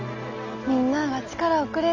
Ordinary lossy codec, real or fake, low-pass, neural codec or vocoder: MP3, 64 kbps; real; 7.2 kHz; none